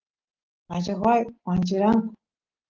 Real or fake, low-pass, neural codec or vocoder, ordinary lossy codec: real; 7.2 kHz; none; Opus, 16 kbps